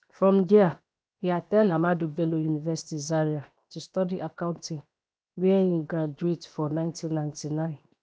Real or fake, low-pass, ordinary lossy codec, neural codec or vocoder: fake; none; none; codec, 16 kHz, 0.7 kbps, FocalCodec